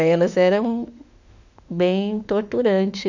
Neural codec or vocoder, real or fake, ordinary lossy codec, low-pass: autoencoder, 48 kHz, 32 numbers a frame, DAC-VAE, trained on Japanese speech; fake; none; 7.2 kHz